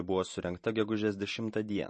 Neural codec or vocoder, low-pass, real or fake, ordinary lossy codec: vocoder, 44.1 kHz, 128 mel bands every 512 samples, BigVGAN v2; 10.8 kHz; fake; MP3, 32 kbps